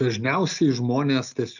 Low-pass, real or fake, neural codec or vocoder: 7.2 kHz; real; none